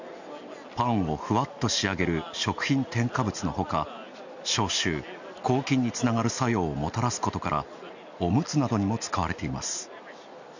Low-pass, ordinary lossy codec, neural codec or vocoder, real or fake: 7.2 kHz; none; none; real